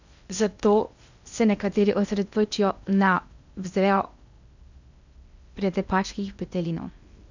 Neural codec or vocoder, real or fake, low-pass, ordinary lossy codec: codec, 16 kHz in and 24 kHz out, 0.6 kbps, FocalCodec, streaming, 2048 codes; fake; 7.2 kHz; none